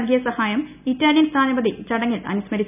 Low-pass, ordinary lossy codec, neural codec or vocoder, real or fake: 3.6 kHz; none; none; real